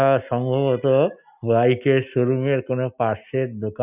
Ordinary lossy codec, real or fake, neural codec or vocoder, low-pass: none; real; none; 3.6 kHz